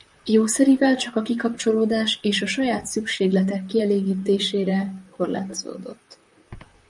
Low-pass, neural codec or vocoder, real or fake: 10.8 kHz; vocoder, 44.1 kHz, 128 mel bands, Pupu-Vocoder; fake